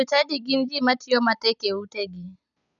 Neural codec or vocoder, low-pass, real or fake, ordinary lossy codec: none; 7.2 kHz; real; none